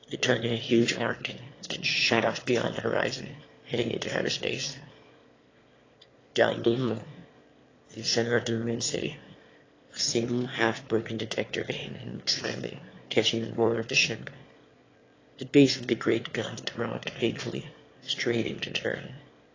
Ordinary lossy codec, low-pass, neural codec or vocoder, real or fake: AAC, 32 kbps; 7.2 kHz; autoencoder, 22.05 kHz, a latent of 192 numbers a frame, VITS, trained on one speaker; fake